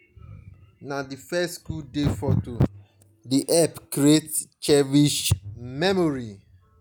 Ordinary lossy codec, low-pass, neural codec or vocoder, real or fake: none; none; none; real